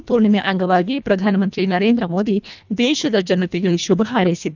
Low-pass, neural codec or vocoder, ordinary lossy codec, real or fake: 7.2 kHz; codec, 24 kHz, 1.5 kbps, HILCodec; none; fake